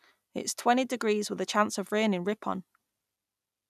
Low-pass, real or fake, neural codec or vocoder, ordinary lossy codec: 14.4 kHz; real; none; none